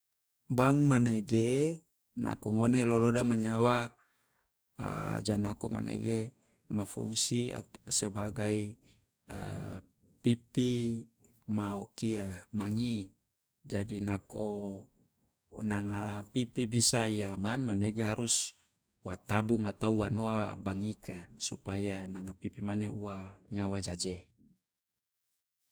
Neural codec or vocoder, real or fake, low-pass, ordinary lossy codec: codec, 44.1 kHz, 2.6 kbps, DAC; fake; none; none